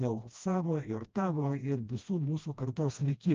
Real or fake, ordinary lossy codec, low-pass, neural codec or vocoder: fake; Opus, 24 kbps; 7.2 kHz; codec, 16 kHz, 1 kbps, FreqCodec, smaller model